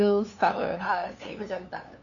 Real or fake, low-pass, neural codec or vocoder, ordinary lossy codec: fake; 7.2 kHz; codec, 16 kHz, 2 kbps, FunCodec, trained on LibriTTS, 25 frames a second; none